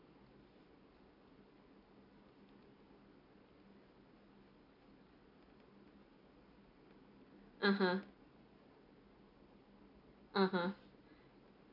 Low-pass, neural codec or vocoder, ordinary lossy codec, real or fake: 5.4 kHz; none; none; real